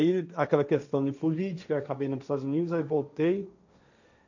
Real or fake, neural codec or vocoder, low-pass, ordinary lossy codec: fake; codec, 16 kHz, 1.1 kbps, Voila-Tokenizer; 7.2 kHz; none